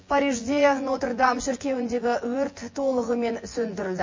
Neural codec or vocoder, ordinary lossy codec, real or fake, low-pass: vocoder, 24 kHz, 100 mel bands, Vocos; MP3, 32 kbps; fake; 7.2 kHz